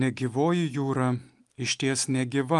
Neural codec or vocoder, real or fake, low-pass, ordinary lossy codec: none; real; 10.8 kHz; Opus, 32 kbps